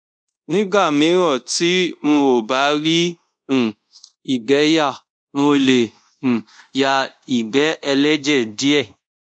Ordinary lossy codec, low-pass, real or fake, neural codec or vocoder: none; 9.9 kHz; fake; codec, 24 kHz, 0.5 kbps, DualCodec